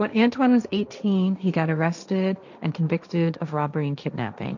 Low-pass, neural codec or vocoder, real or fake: 7.2 kHz; codec, 16 kHz, 1.1 kbps, Voila-Tokenizer; fake